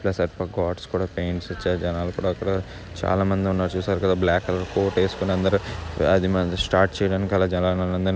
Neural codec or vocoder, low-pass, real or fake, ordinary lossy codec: none; none; real; none